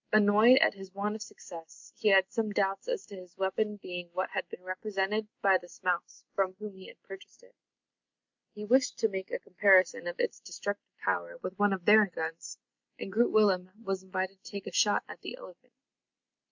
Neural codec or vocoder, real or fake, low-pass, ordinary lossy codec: none; real; 7.2 kHz; MP3, 64 kbps